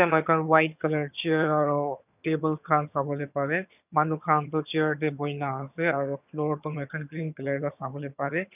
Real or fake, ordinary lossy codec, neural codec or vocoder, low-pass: fake; none; vocoder, 22.05 kHz, 80 mel bands, HiFi-GAN; 3.6 kHz